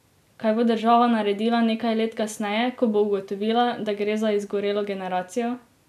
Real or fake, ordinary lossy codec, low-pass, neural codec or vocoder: real; none; 14.4 kHz; none